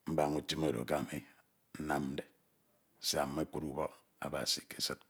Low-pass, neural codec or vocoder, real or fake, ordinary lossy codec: none; none; real; none